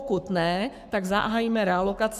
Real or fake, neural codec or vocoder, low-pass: fake; codec, 44.1 kHz, 7.8 kbps, Pupu-Codec; 14.4 kHz